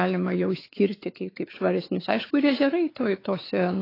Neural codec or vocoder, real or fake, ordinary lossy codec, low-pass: codec, 16 kHz, 4 kbps, X-Codec, WavLM features, trained on Multilingual LibriSpeech; fake; AAC, 24 kbps; 5.4 kHz